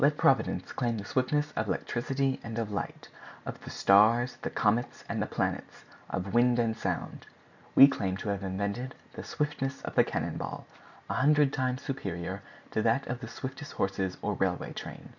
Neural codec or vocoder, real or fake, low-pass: none; real; 7.2 kHz